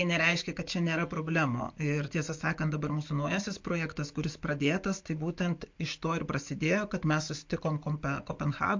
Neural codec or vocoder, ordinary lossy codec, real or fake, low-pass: none; MP3, 48 kbps; real; 7.2 kHz